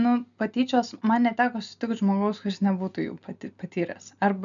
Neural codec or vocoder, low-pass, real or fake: none; 7.2 kHz; real